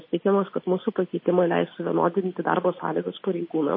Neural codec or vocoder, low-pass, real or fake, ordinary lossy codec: none; 5.4 kHz; real; MP3, 24 kbps